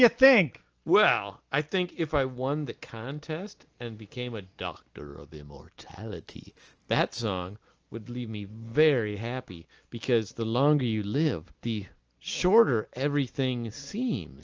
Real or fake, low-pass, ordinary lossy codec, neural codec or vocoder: real; 7.2 kHz; Opus, 24 kbps; none